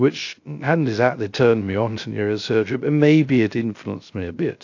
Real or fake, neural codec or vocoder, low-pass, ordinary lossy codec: fake; codec, 16 kHz, 0.3 kbps, FocalCodec; 7.2 kHz; AAC, 48 kbps